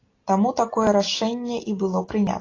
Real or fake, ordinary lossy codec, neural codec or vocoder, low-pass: real; AAC, 32 kbps; none; 7.2 kHz